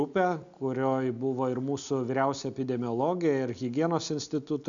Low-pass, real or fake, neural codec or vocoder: 7.2 kHz; real; none